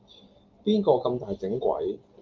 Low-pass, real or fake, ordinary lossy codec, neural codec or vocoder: 7.2 kHz; real; Opus, 32 kbps; none